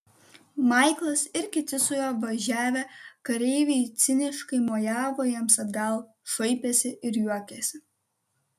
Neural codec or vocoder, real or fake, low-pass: none; real; 14.4 kHz